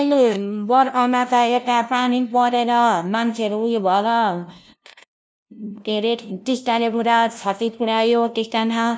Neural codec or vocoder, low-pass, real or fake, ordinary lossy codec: codec, 16 kHz, 0.5 kbps, FunCodec, trained on LibriTTS, 25 frames a second; none; fake; none